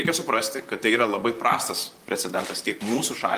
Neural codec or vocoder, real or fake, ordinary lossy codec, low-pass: vocoder, 44.1 kHz, 128 mel bands, Pupu-Vocoder; fake; Opus, 32 kbps; 14.4 kHz